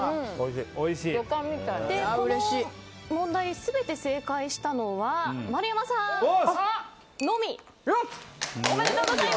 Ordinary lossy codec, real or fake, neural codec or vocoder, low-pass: none; real; none; none